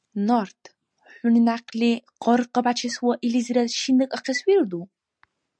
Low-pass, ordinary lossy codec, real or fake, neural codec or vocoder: 9.9 kHz; MP3, 96 kbps; real; none